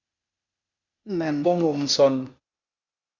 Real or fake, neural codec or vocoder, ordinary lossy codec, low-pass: fake; codec, 16 kHz, 0.8 kbps, ZipCodec; Opus, 64 kbps; 7.2 kHz